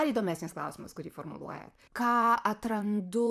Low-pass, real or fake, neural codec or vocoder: 14.4 kHz; fake; vocoder, 44.1 kHz, 128 mel bands, Pupu-Vocoder